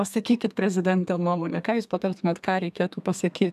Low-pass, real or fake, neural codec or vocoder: 14.4 kHz; fake; codec, 32 kHz, 1.9 kbps, SNAC